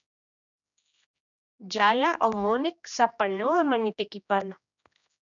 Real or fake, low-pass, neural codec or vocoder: fake; 7.2 kHz; codec, 16 kHz, 1 kbps, X-Codec, HuBERT features, trained on general audio